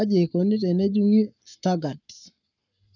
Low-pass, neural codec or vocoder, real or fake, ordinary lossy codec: 7.2 kHz; codec, 44.1 kHz, 7.8 kbps, Pupu-Codec; fake; none